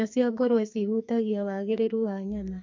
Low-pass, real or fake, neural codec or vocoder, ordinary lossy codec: 7.2 kHz; fake; codec, 44.1 kHz, 2.6 kbps, SNAC; MP3, 48 kbps